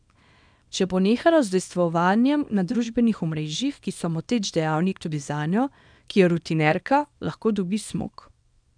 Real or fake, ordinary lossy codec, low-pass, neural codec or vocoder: fake; none; 9.9 kHz; codec, 24 kHz, 0.9 kbps, WavTokenizer, small release